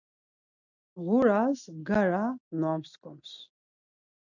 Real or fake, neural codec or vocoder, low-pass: real; none; 7.2 kHz